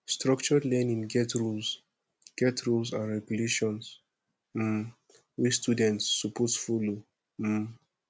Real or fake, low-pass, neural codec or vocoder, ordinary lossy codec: real; none; none; none